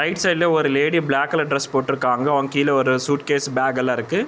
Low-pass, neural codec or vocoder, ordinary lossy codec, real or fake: none; none; none; real